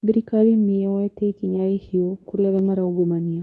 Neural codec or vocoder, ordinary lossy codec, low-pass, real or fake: codec, 24 kHz, 0.9 kbps, WavTokenizer, medium speech release version 2; none; none; fake